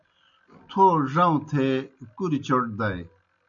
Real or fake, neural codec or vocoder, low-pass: real; none; 7.2 kHz